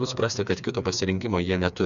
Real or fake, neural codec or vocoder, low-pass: fake; codec, 16 kHz, 4 kbps, FreqCodec, smaller model; 7.2 kHz